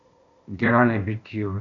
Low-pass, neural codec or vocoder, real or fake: 7.2 kHz; codec, 16 kHz, 0.8 kbps, ZipCodec; fake